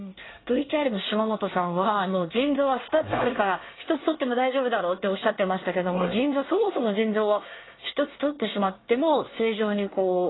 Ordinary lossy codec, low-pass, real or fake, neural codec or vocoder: AAC, 16 kbps; 7.2 kHz; fake; codec, 24 kHz, 1 kbps, SNAC